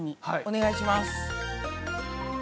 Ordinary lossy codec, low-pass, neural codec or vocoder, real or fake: none; none; none; real